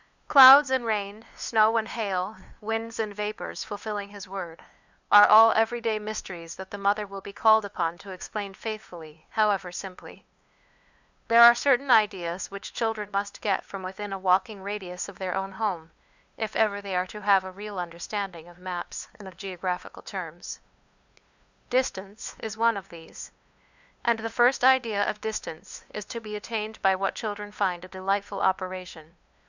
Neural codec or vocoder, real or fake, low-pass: codec, 16 kHz, 2 kbps, FunCodec, trained on LibriTTS, 25 frames a second; fake; 7.2 kHz